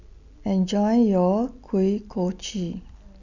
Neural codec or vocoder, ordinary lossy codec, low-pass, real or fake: none; none; 7.2 kHz; real